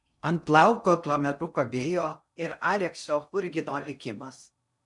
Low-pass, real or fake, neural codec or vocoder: 10.8 kHz; fake; codec, 16 kHz in and 24 kHz out, 0.6 kbps, FocalCodec, streaming, 2048 codes